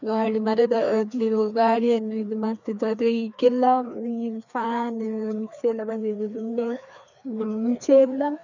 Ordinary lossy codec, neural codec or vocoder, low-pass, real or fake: none; codec, 16 kHz, 2 kbps, FreqCodec, larger model; 7.2 kHz; fake